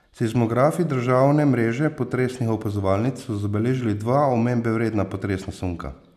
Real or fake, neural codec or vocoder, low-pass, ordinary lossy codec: real; none; 14.4 kHz; none